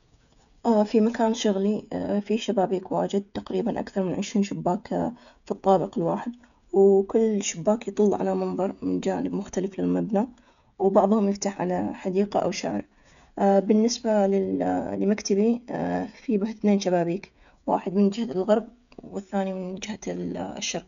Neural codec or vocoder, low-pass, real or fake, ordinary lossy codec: codec, 16 kHz, 16 kbps, FreqCodec, smaller model; 7.2 kHz; fake; none